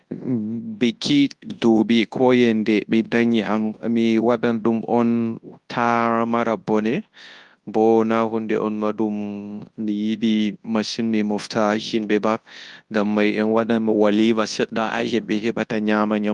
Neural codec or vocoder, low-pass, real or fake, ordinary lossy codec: codec, 24 kHz, 0.9 kbps, WavTokenizer, large speech release; 10.8 kHz; fake; Opus, 32 kbps